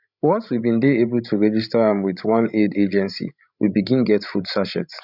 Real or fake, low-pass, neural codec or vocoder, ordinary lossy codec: fake; 5.4 kHz; codec, 16 kHz, 16 kbps, FreqCodec, larger model; none